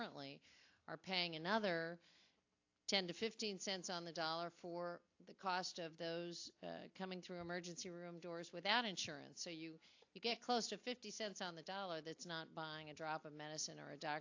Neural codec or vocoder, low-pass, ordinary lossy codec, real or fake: none; 7.2 kHz; AAC, 48 kbps; real